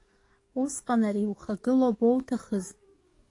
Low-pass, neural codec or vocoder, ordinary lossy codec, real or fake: 10.8 kHz; codec, 44.1 kHz, 7.8 kbps, DAC; AAC, 48 kbps; fake